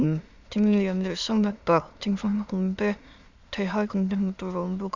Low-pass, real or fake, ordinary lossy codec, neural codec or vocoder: 7.2 kHz; fake; Opus, 64 kbps; autoencoder, 22.05 kHz, a latent of 192 numbers a frame, VITS, trained on many speakers